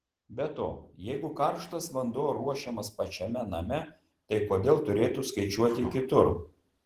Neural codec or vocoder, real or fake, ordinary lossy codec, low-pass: vocoder, 44.1 kHz, 128 mel bands every 512 samples, BigVGAN v2; fake; Opus, 16 kbps; 14.4 kHz